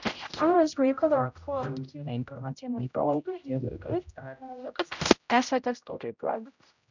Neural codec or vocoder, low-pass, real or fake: codec, 16 kHz, 0.5 kbps, X-Codec, HuBERT features, trained on general audio; 7.2 kHz; fake